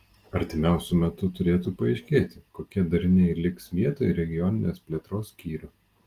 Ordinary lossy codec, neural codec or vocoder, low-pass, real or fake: Opus, 24 kbps; none; 14.4 kHz; real